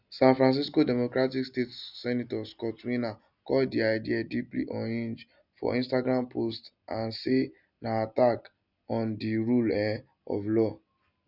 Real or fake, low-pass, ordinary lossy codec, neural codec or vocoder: real; 5.4 kHz; none; none